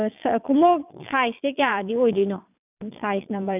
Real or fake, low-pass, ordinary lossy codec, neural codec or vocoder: fake; 3.6 kHz; none; vocoder, 22.05 kHz, 80 mel bands, Vocos